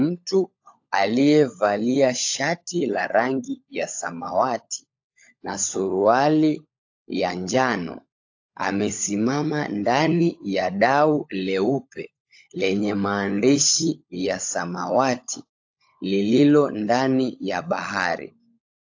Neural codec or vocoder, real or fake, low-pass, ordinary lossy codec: codec, 16 kHz, 16 kbps, FunCodec, trained on LibriTTS, 50 frames a second; fake; 7.2 kHz; AAC, 48 kbps